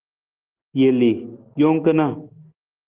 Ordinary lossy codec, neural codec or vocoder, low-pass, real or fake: Opus, 16 kbps; none; 3.6 kHz; real